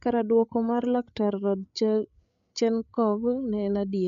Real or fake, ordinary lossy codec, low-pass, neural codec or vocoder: fake; none; 7.2 kHz; codec, 16 kHz, 8 kbps, FreqCodec, larger model